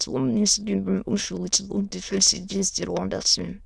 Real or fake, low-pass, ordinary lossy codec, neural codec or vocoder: fake; none; none; autoencoder, 22.05 kHz, a latent of 192 numbers a frame, VITS, trained on many speakers